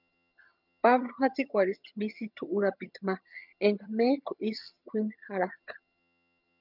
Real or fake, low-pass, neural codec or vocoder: fake; 5.4 kHz; vocoder, 22.05 kHz, 80 mel bands, HiFi-GAN